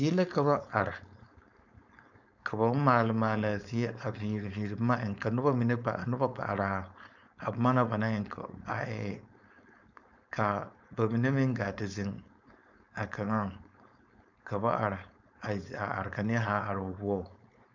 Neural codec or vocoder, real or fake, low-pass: codec, 16 kHz, 4.8 kbps, FACodec; fake; 7.2 kHz